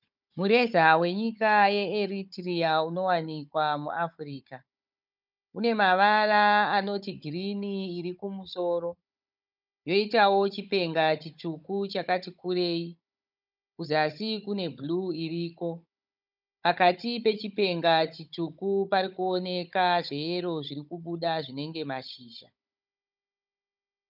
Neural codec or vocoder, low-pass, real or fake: codec, 16 kHz, 16 kbps, FunCodec, trained on Chinese and English, 50 frames a second; 5.4 kHz; fake